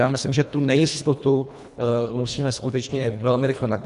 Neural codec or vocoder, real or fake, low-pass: codec, 24 kHz, 1.5 kbps, HILCodec; fake; 10.8 kHz